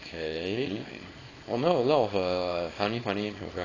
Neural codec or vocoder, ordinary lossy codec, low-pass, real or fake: codec, 24 kHz, 0.9 kbps, WavTokenizer, small release; AAC, 32 kbps; 7.2 kHz; fake